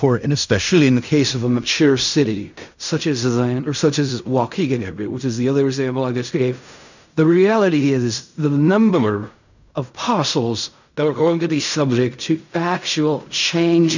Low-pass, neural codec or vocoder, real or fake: 7.2 kHz; codec, 16 kHz in and 24 kHz out, 0.4 kbps, LongCat-Audio-Codec, fine tuned four codebook decoder; fake